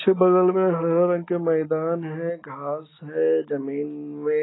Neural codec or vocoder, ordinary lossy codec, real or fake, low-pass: none; AAC, 16 kbps; real; 7.2 kHz